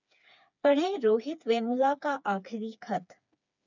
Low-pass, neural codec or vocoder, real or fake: 7.2 kHz; codec, 16 kHz, 4 kbps, FreqCodec, smaller model; fake